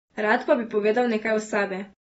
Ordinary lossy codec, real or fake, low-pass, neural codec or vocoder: AAC, 24 kbps; real; 10.8 kHz; none